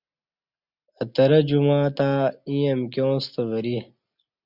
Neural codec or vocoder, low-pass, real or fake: none; 5.4 kHz; real